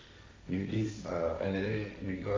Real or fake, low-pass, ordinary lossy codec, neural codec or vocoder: fake; none; none; codec, 16 kHz, 1.1 kbps, Voila-Tokenizer